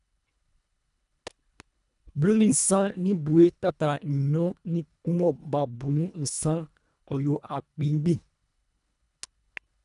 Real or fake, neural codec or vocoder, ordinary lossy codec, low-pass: fake; codec, 24 kHz, 1.5 kbps, HILCodec; none; 10.8 kHz